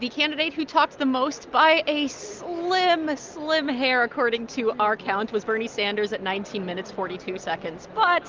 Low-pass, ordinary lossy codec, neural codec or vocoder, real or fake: 7.2 kHz; Opus, 24 kbps; none; real